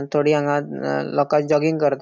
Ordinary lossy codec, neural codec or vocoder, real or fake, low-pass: none; none; real; none